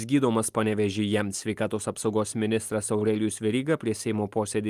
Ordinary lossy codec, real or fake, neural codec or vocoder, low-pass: Opus, 32 kbps; real; none; 14.4 kHz